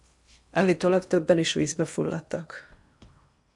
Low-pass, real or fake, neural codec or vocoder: 10.8 kHz; fake; codec, 16 kHz in and 24 kHz out, 0.8 kbps, FocalCodec, streaming, 65536 codes